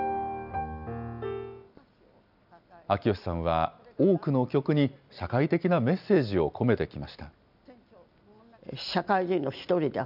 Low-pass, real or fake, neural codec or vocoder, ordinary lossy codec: 5.4 kHz; real; none; none